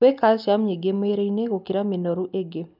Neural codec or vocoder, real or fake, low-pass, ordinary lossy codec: none; real; 5.4 kHz; none